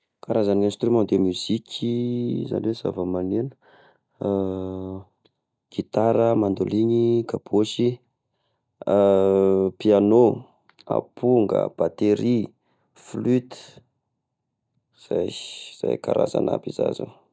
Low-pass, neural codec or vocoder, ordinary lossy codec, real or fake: none; none; none; real